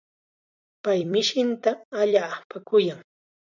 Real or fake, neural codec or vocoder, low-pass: fake; vocoder, 44.1 kHz, 128 mel bands every 256 samples, BigVGAN v2; 7.2 kHz